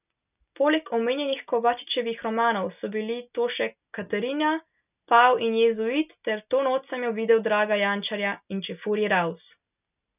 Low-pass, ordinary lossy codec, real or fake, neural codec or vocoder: 3.6 kHz; none; real; none